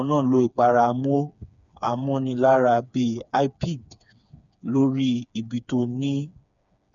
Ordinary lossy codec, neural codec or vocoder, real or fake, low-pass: none; codec, 16 kHz, 4 kbps, FreqCodec, smaller model; fake; 7.2 kHz